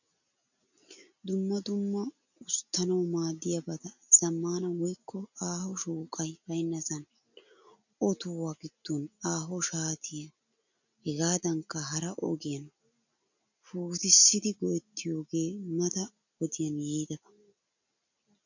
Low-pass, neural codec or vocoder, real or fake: 7.2 kHz; none; real